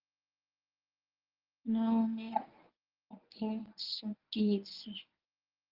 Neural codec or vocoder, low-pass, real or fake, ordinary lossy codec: codec, 24 kHz, 0.9 kbps, WavTokenizer, medium speech release version 1; 5.4 kHz; fake; Opus, 24 kbps